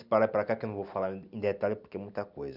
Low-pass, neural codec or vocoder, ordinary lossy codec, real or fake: 5.4 kHz; none; Opus, 64 kbps; real